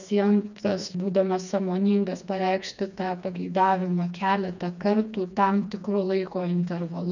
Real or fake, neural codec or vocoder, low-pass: fake; codec, 16 kHz, 2 kbps, FreqCodec, smaller model; 7.2 kHz